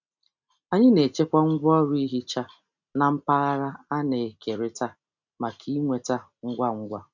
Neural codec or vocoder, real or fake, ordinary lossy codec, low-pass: none; real; none; 7.2 kHz